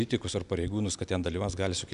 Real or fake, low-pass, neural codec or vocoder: real; 10.8 kHz; none